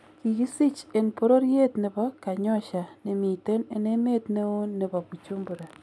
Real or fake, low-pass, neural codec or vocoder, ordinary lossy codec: real; none; none; none